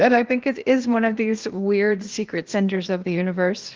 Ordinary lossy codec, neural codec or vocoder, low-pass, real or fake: Opus, 16 kbps; codec, 16 kHz, 0.8 kbps, ZipCodec; 7.2 kHz; fake